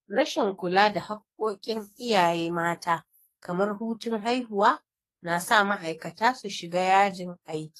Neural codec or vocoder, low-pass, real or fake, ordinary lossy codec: codec, 44.1 kHz, 2.6 kbps, SNAC; 14.4 kHz; fake; AAC, 48 kbps